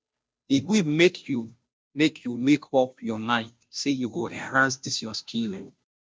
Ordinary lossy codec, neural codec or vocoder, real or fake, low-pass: none; codec, 16 kHz, 0.5 kbps, FunCodec, trained on Chinese and English, 25 frames a second; fake; none